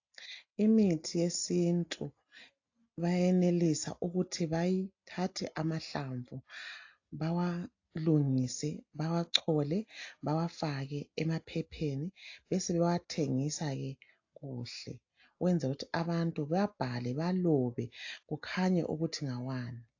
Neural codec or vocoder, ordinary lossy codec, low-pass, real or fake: none; AAC, 48 kbps; 7.2 kHz; real